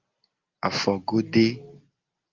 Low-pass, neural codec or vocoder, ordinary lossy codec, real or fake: 7.2 kHz; none; Opus, 32 kbps; real